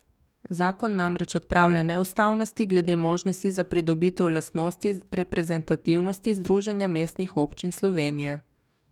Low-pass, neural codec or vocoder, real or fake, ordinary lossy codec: 19.8 kHz; codec, 44.1 kHz, 2.6 kbps, DAC; fake; none